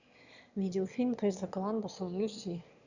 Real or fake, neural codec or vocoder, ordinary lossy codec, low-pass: fake; autoencoder, 22.05 kHz, a latent of 192 numbers a frame, VITS, trained on one speaker; Opus, 64 kbps; 7.2 kHz